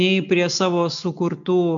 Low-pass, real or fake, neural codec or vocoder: 7.2 kHz; real; none